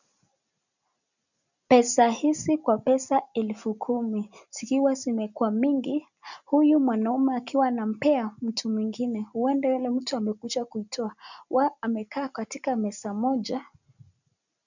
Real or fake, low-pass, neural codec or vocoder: real; 7.2 kHz; none